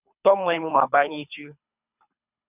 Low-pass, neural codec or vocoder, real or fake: 3.6 kHz; codec, 24 kHz, 3 kbps, HILCodec; fake